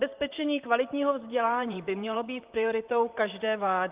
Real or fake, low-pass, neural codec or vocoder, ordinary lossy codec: fake; 3.6 kHz; vocoder, 44.1 kHz, 128 mel bands, Pupu-Vocoder; Opus, 24 kbps